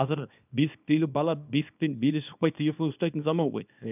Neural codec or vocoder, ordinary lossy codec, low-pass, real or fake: codec, 24 kHz, 0.9 kbps, WavTokenizer, small release; none; 3.6 kHz; fake